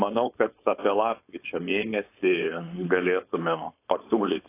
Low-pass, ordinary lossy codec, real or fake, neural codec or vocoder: 3.6 kHz; AAC, 24 kbps; fake; codec, 16 kHz, 4.8 kbps, FACodec